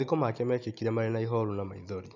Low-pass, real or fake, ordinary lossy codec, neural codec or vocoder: 7.2 kHz; real; none; none